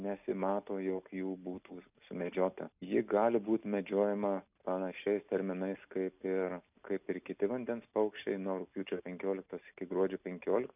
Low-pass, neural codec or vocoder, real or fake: 3.6 kHz; none; real